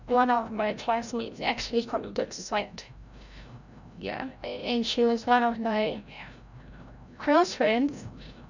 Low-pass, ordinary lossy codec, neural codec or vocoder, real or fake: 7.2 kHz; none; codec, 16 kHz, 0.5 kbps, FreqCodec, larger model; fake